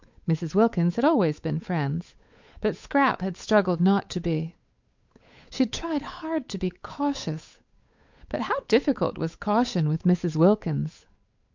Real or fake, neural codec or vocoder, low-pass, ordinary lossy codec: fake; codec, 16 kHz, 8 kbps, FunCodec, trained on Chinese and English, 25 frames a second; 7.2 kHz; MP3, 64 kbps